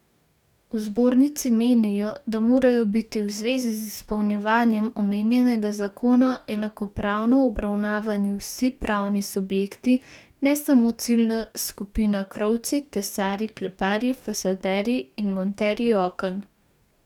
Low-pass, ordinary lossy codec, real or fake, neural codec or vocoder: 19.8 kHz; none; fake; codec, 44.1 kHz, 2.6 kbps, DAC